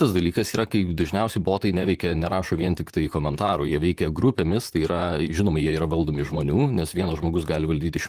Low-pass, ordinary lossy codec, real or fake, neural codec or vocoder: 14.4 kHz; Opus, 24 kbps; fake; vocoder, 44.1 kHz, 128 mel bands, Pupu-Vocoder